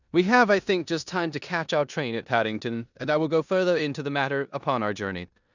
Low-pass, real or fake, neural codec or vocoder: 7.2 kHz; fake; codec, 16 kHz in and 24 kHz out, 0.9 kbps, LongCat-Audio-Codec, four codebook decoder